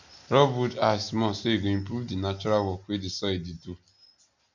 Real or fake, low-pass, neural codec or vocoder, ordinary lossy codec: real; 7.2 kHz; none; none